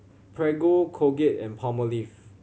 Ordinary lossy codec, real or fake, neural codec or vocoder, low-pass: none; real; none; none